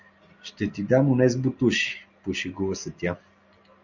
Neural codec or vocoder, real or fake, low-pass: none; real; 7.2 kHz